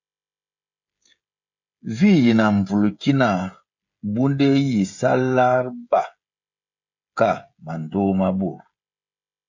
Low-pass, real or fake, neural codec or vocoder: 7.2 kHz; fake; codec, 16 kHz, 16 kbps, FreqCodec, smaller model